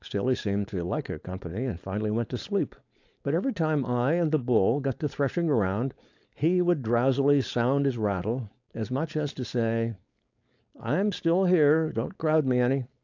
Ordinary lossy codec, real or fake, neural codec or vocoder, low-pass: AAC, 48 kbps; fake; codec, 16 kHz, 4.8 kbps, FACodec; 7.2 kHz